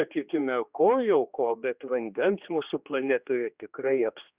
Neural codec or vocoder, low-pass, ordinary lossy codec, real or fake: codec, 16 kHz, 2 kbps, X-Codec, HuBERT features, trained on general audio; 3.6 kHz; Opus, 64 kbps; fake